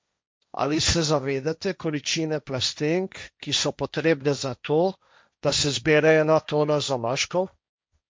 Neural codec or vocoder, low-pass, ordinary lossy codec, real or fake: codec, 16 kHz, 1.1 kbps, Voila-Tokenizer; none; none; fake